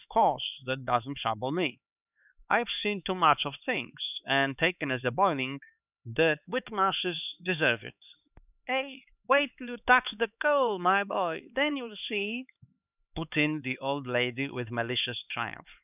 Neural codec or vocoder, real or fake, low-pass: codec, 16 kHz, 4 kbps, X-Codec, HuBERT features, trained on LibriSpeech; fake; 3.6 kHz